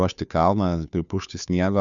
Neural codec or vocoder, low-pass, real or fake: codec, 16 kHz, 2 kbps, FunCodec, trained on Chinese and English, 25 frames a second; 7.2 kHz; fake